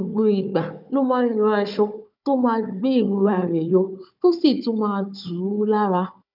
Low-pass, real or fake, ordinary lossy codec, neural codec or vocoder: 5.4 kHz; fake; none; codec, 16 kHz, 4 kbps, FunCodec, trained on Chinese and English, 50 frames a second